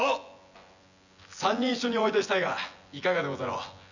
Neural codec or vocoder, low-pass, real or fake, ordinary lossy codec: vocoder, 24 kHz, 100 mel bands, Vocos; 7.2 kHz; fake; none